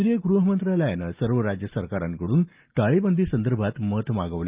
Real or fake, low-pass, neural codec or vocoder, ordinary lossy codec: real; 3.6 kHz; none; Opus, 32 kbps